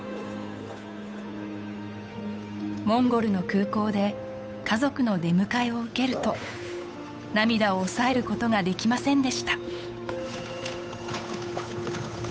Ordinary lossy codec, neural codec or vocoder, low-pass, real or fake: none; codec, 16 kHz, 8 kbps, FunCodec, trained on Chinese and English, 25 frames a second; none; fake